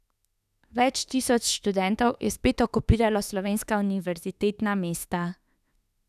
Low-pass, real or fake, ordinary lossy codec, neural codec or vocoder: 14.4 kHz; fake; none; autoencoder, 48 kHz, 32 numbers a frame, DAC-VAE, trained on Japanese speech